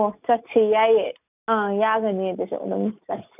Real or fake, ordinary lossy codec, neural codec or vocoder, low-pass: real; none; none; 3.6 kHz